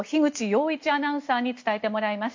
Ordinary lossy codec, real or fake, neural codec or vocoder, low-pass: MP3, 64 kbps; real; none; 7.2 kHz